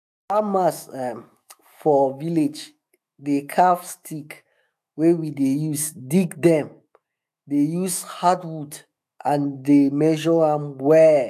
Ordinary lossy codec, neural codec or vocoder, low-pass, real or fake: AAC, 96 kbps; autoencoder, 48 kHz, 128 numbers a frame, DAC-VAE, trained on Japanese speech; 14.4 kHz; fake